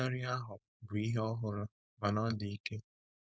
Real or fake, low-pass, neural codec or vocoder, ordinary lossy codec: fake; none; codec, 16 kHz, 4.8 kbps, FACodec; none